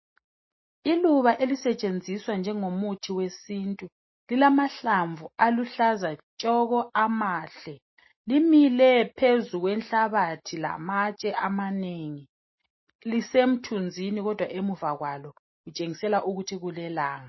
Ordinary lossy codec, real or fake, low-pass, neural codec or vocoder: MP3, 24 kbps; real; 7.2 kHz; none